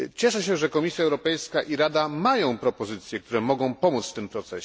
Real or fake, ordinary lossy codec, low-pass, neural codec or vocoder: real; none; none; none